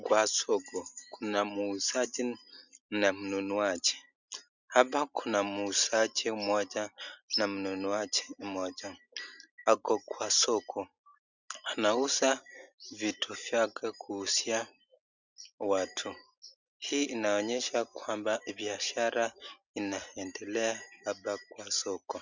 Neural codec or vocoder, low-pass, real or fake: none; 7.2 kHz; real